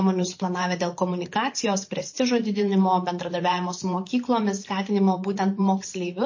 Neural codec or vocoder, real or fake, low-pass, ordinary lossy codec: vocoder, 44.1 kHz, 128 mel bands every 512 samples, BigVGAN v2; fake; 7.2 kHz; MP3, 32 kbps